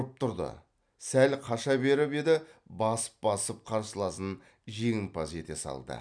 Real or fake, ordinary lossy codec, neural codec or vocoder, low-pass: real; none; none; 9.9 kHz